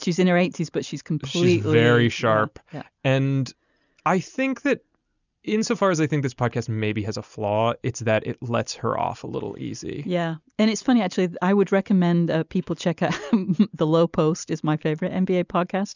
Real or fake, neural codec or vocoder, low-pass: real; none; 7.2 kHz